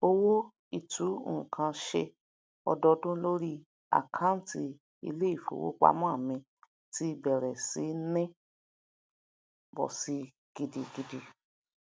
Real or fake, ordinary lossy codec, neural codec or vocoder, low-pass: real; none; none; none